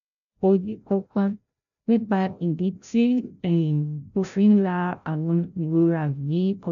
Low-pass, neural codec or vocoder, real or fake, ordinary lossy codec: 7.2 kHz; codec, 16 kHz, 0.5 kbps, FreqCodec, larger model; fake; none